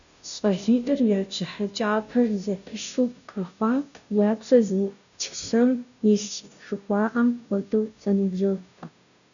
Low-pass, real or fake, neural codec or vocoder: 7.2 kHz; fake; codec, 16 kHz, 0.5 kbps, FunCodec, trained on Chinese and English, 25 frames a second